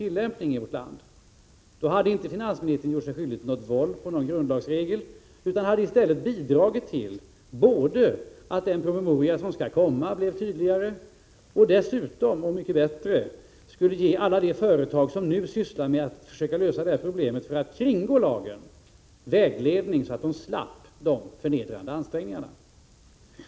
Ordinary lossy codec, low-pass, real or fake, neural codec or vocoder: none; none; real; none